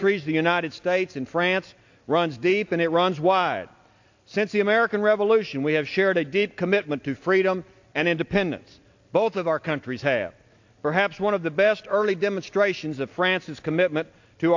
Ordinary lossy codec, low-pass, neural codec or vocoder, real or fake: AAC, 48 kbps; 7.2 kHz; none; real